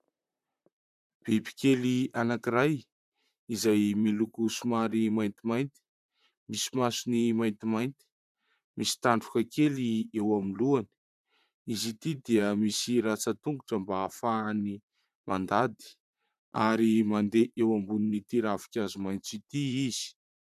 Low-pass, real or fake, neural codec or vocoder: 14.4 kHz; fake; vocoder, 44.1 kHz, 128 mel bands, Pupu-Vocoder